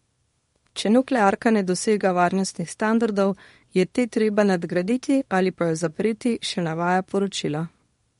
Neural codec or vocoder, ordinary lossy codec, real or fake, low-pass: codec, 24 kHz, 0.9 kbps, WavTokenizer, small release; MP3, 48 kbps; fake; 10.8 kHz